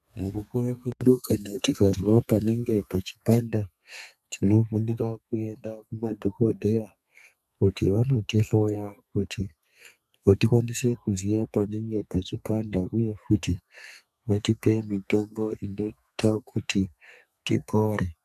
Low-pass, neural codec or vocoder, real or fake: 14.4 kHz; codec, 32 kHz, 1.9 kbps, SNAC; fake